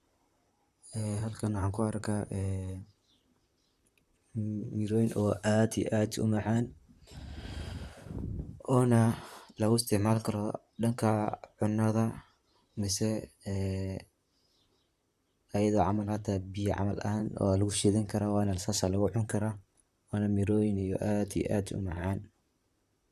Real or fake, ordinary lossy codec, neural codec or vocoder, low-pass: fake; Opus, 64 kbps; vocoder, 44.1 kHz, 128 mel bands, Pupu-Vocoder; 14.4 kHz